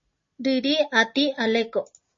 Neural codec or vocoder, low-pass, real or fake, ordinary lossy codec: none; 7.2 kHz; real; MP3, 32 kbps